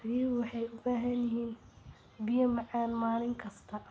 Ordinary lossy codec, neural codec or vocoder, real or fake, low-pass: none; none; real; none